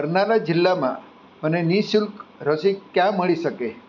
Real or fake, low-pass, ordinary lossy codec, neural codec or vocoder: real; 7.2 kHz; none; none